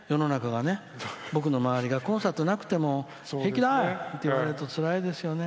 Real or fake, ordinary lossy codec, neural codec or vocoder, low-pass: real; none; none; none